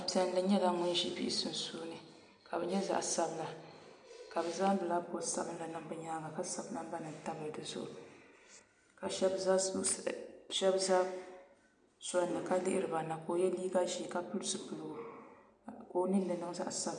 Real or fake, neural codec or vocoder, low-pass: real; none; 9.9 kHz